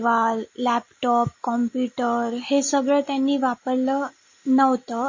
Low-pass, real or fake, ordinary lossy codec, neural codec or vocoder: 7.2 kHz; real; MP3, 32 kbps; none